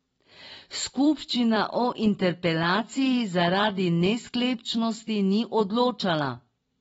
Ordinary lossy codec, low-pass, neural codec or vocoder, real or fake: AAC, 24 kbps; 19.8 kHz; vocoder, 44.1 kHz, 128 mel bands every 256 samples, BigVGAN v2; fake